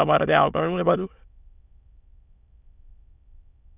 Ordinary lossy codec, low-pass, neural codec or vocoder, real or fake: none; 3.6 kHz; autoencoder, 22.05 kHz, a latent of 192 numbers a frame, VITS, trained on many speakers; fake